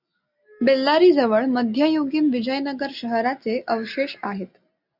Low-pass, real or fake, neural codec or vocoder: 5.4 kHz; real; none